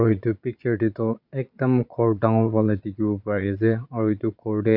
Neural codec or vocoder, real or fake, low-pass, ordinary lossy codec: vocoder, 22.05 kHz, 80 mel bands, Vocos; fake; 5.4 kHz; none